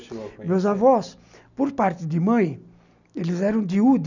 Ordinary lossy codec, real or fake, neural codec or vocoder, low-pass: none; real; none; 7.2 kHz